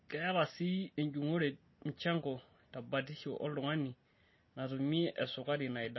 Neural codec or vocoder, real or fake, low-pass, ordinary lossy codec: none; real; 7.2 kHz; MP3, 24 kbps